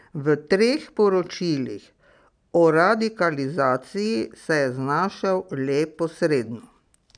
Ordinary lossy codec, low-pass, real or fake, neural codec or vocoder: none; 9.9 kHz; real; none